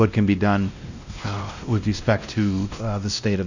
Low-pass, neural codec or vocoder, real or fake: 7.2 kHz; codec, 16 kHz, 1 kbps, X-Codec, WavLM features, trained on Multilingual LibriSpeech; fake